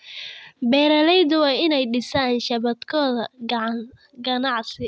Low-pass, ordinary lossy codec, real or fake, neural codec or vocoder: none; none; real; none